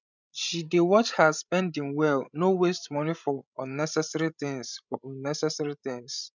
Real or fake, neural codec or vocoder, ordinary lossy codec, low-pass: fake; codec, 16 kHz, 16 kbps, FreqCodec, larger model; none; 7.2 kHz